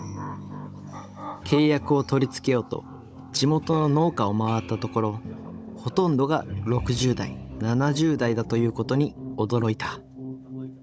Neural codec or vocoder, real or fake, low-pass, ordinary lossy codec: codec, 16 kHz, 16 kbps, FunCodec, trained on Chinese and English, 50 frames a second; fake; none; none